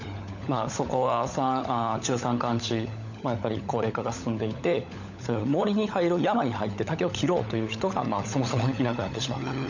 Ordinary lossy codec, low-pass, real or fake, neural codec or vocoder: none; 7.2 kHz; fake; codec, 16 kHz, 16 kbps, FunCodec, trained on LibriTTS, 50 frames a second